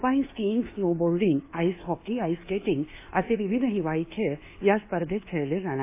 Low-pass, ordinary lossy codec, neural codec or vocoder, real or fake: 3.6 kHz; AAC, 24 kbps; codec, 24 kHz, 1.2 kbps, DualCodec; fake